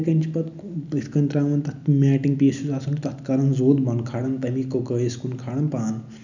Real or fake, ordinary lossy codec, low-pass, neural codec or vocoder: real; none; 7.2 kHz; none